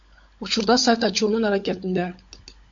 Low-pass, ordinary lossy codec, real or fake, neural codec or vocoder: 7.2 kHz; MP3, 48 kbps; fake; codec, 16 kHz, 16 kbps, FunCodec, trained on LibriTTS, 50 frames a second